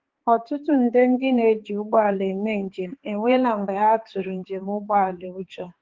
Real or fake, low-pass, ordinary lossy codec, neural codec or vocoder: fake; 7.2 kHz; Opus, 16 kbps; codec, 16 kHz, 4 kbps, X-Codec, HuBERT features, trained on balanced general audio